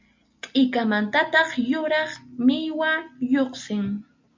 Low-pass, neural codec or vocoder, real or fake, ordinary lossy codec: 7.2 kHz; none; real; MP3, 64 kbps